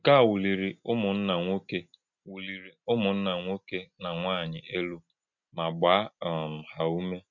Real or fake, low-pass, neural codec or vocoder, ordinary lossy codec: real; 7.2 kHz; none; MP3, 64 kbps